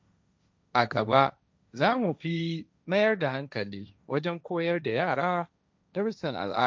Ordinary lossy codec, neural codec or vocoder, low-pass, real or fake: none; codec, 16 kHz, 1.1 kbps, Voila-Tokenizer; none; fake